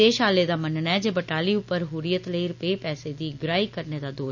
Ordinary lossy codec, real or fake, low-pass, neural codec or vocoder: none; real; 7.2 kHz; none